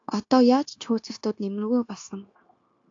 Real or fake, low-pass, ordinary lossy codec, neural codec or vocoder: fake; 7.2 kHz; AAC, 48 kbps; codec, 16 kHz, 0.9 kbps, LongCat-Audio-Codec